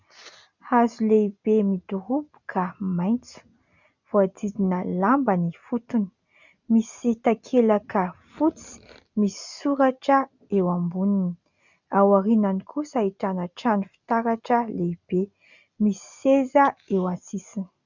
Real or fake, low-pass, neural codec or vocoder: real; 7.2 kHz; none